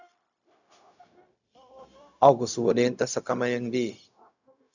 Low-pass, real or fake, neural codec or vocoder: 7.2 kHz; fake; codec, 16 kHz, 0.4 kbps, LongCat-Audio-Codec